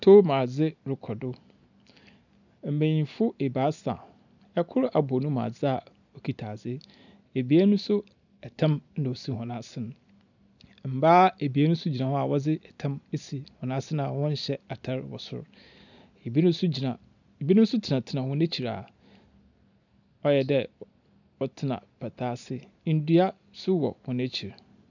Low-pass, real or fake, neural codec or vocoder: 7.2 kHz; real; none